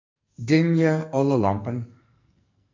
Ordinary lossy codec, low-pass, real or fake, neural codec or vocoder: MP3, 64 kbps; 7.2 kHz; fake; codec, 44.1 kHz, 2.6 kbps, SNAC